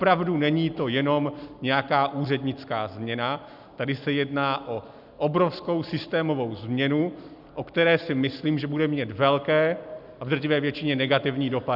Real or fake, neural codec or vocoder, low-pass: real; none; 5.4 kHz